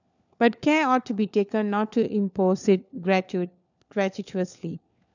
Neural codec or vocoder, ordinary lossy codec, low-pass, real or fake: codec, 16 kHz, 4 kbps, FunCodec, trained on LibriTTS, 50 frames a second; none; 7.2 kHz; fake